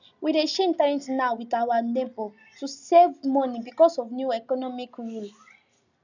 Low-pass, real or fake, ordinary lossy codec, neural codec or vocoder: 7.2 kHz; real; none; none